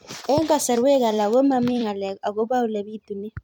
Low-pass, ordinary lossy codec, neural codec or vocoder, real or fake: 19.8 kHz; MP3, 96 kbps; vocoder, 44.1 kHz, 128 mel bands every 256 samples, BigVGAN v2; fake